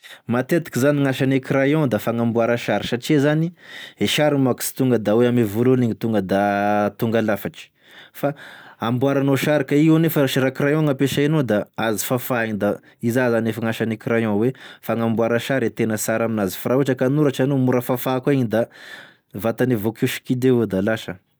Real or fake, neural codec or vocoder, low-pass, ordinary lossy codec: real; none; none; none